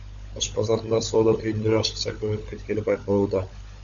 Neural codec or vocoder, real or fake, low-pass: codec, 16 kHz, 16 kbps, FunCodec, trained on LibriTTS, 50 frames a second; fake; 7.2 kHz